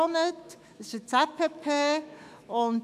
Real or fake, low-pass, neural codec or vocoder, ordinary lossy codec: fake; 14.4 kHz; autoencoder, 48 kHz, 128 numbers a frame, DAC-VAE, trained on Japanese speech; AAC, 96 kbps